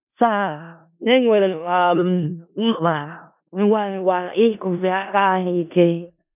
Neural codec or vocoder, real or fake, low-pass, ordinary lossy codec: codec, 16 kHz in and 24 kHz out, 0.4 kbps, LongCat-Audio-Codec, four codebook decoder; fake; 3.6 kHz; none